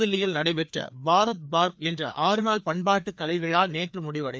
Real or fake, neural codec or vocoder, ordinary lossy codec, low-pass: fake; codec, 16 kHz, 2 kbps, FreqCodec, larger model; none; none